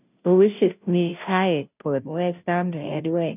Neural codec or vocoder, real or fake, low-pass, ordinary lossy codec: codec, 16 kHz, 0.5 kbps, FunCodec, trained on Chinese and English, 25 frames a second; fake; 3.6 kHz; none